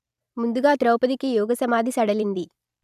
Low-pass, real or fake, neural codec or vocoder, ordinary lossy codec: 14.4 kHz; fake; vocoder, 44.1 kHz, 128 mel bands every 256 samples, BigVGAN v2; none